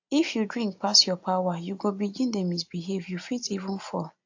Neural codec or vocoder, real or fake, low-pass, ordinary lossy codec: none; real; 7.2 kHz; AAC, 48 kbps